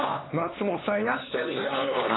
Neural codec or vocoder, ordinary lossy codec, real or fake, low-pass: codec, 16 kHz, 2 kbps, X-Codec, HuBERT features, trained on LibriSpeech; AAC, 16 kbps; fake; 7.2 kHz